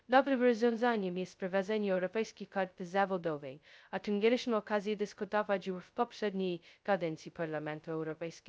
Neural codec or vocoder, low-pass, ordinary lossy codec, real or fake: codec, 16 kHz, 0.2 kbps, FocalCodec; none; none; fake